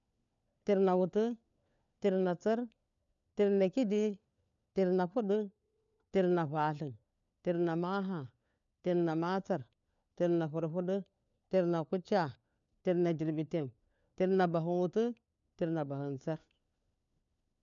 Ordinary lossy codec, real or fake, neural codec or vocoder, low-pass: none; fake; codec, 16 kHz, 4 kbps, FunCodec, trained on LibriTTS, 50 frames a second; 7.2 kHz